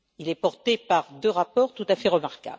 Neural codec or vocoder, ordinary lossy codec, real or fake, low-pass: none; none; real; none